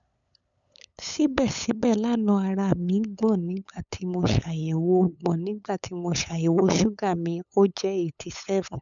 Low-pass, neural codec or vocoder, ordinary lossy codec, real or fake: 7.2 kHz; codec, 16 kHz, 8 kbps, FunCodec, trained on LibriTTS, 25 frames a second; none; fake